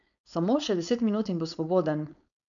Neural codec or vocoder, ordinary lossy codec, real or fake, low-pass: codec, 16 kHz, 4.8 kbps, FACodec; none; fake; 7.2 kHz